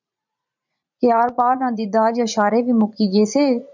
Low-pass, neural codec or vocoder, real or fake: 7.2 kHz; vocoder, 44.1 kHz, 80 mel bands, Vocos; fake